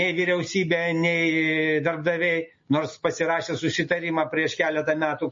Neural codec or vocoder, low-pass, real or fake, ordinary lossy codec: none; 7.2 kHz; real; MP3, 32 kbps